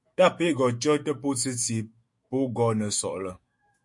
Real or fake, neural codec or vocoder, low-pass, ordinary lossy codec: fake; autoencoder, 48 kHz, 128 numbers a frame, DAC-VAE, trained on Japanese speech; 10.8 kHz; MP3, 48 kbps